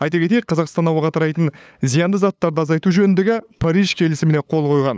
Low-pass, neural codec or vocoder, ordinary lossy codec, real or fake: none; codec, 16 kHz, 8 kbps, FunCodec, trained on LibriTTS, 25 frames a second; none; fake